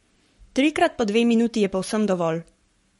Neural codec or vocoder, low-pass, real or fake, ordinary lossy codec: codec, 44.1 kHz, 7.8 kbps, Pupu-Codec; 19.8 kHz; fake; MP3, 48 kbps